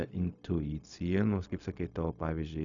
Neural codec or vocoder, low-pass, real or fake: codec, 16 kHz, 0.4 kbps, LongCat-Audio-Codec; 7.2 kHz; fake